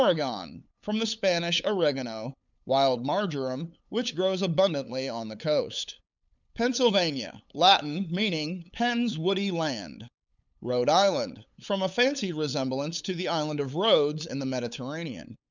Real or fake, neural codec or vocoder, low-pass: fake; codec, 16 kHz, 8 kbps, FunCodec, trained on LibriTTS, 25 frames a second; 7.2 kHz